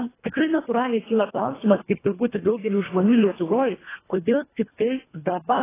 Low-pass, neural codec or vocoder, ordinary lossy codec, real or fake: 3.6 kHz; codec, 24 kHz, 1.5 kbps, HILCodec; AAC, 16 kbps; fake